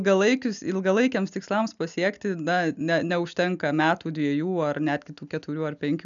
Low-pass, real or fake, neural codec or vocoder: 7.2 kHz; real; none